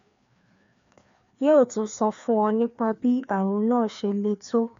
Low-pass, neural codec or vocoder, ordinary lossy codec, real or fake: 7.2 kHz; codec, 16 kHz, 2 kbps, FreqCodec, larger model; MP3, 64 kbps; fake